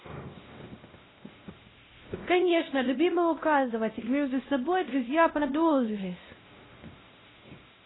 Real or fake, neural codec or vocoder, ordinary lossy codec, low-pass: fake; codec, 16 kHz, 0.5 kbps, X-Codec, WavLM features, trained on Multilingual LibriSpeech; AAC, 16 kbps; 7.2 kHz